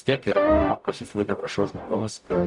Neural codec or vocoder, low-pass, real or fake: codec, 44.1 kHz, 0.9 kbps, DAC; 10.8 kHz; fake